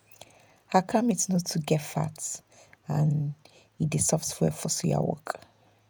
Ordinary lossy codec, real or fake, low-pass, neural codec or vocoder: none; fake; none; vocoder, 48 kHz, 128 mel bands, Vocos